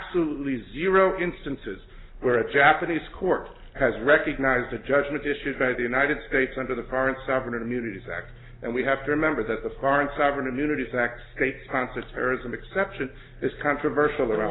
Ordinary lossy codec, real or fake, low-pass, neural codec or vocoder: AAC, 16 kbps; real; 7.2 kHz; none